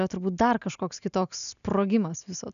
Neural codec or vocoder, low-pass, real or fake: none; 7.2 kHz; real